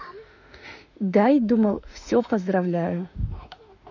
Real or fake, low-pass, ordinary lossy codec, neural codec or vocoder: fake; 7.2 kHz; none; autoencoder, 48 kHz, 32 numbers a frame, DAC-VAE, trained on Japanese speech